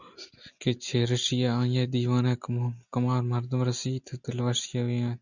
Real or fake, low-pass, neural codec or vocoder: real; 7.2 kHz; none